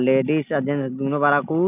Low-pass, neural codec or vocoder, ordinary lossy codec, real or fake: 3.6 kHz; none; none; real